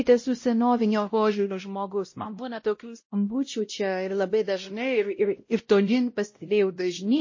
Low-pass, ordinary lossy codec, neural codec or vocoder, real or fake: 7.2 kHz; MP3, 32 kbps; codec, 16 kHz, 0.5 kbps, X-Codec, WavLM features, trained on Multilingual LibriSpeech; fake